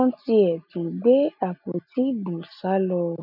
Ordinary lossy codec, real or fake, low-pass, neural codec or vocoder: none; real; 5.4 kHz; none